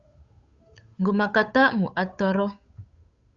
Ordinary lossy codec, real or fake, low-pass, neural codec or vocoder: Opus, 64 kbps; fake; 7.2 kHz; codec, 16 kHz, 8 kbps, FunCodec, trained on Chinese and English, 25 frames a second